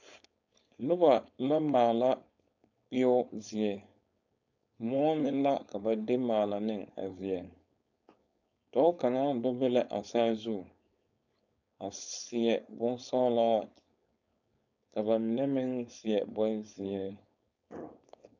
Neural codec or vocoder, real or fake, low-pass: codec, 16 kHz, 4.8 kbps, FACodec; fake; 7.2 kHz